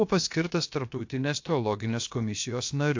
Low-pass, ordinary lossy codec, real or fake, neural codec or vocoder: 7.2 kHz; AAC, 48 kbps; fake; codec, 16 kHz, about 1 kbps, DyCAST, with the encoder's durations